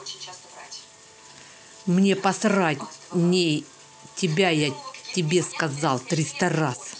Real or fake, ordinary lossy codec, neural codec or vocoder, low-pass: real; none; none; none